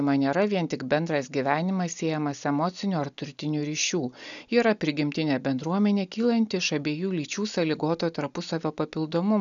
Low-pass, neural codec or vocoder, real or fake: 7.2 kHz; none; real